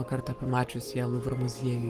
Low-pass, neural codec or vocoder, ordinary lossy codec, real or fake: 14.4 kHz; codec, 44.1 kHz, 7.8 kbps, Pupu-Codec; Opus, 24 kbps; fake